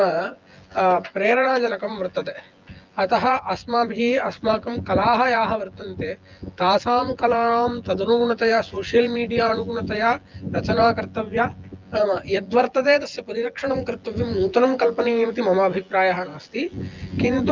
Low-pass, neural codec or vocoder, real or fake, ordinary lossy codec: 7.2 kHz; vocoder, 24 kHz, 100 mel bands, Vocos; fake; Opus, 32 kbps